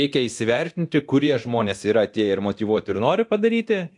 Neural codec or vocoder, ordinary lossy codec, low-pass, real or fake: codec, 24 kHz, 0.9 kbps, DualCodec; AAC, 64 kbps; 10.8 kHz; fake